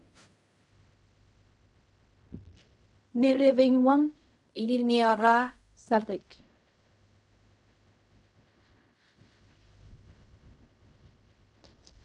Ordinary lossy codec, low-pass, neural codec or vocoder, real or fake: MP3, 64 kbps; 10.8 kHz; codec, 16 kHz in and 24 kHz out, 0.4 kbps, LongCat-Audio-Codec, fine tuned four codebook decoder; fake